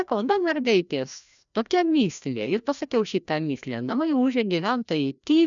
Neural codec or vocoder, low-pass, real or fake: codec, 16 kHz, 1 kbps, FreqCodec, larger model; 7.2 kHz; fake